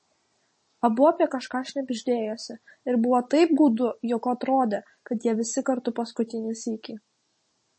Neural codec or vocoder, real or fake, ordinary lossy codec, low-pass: none; real; MP3, 32 kbps; 9.9 kHz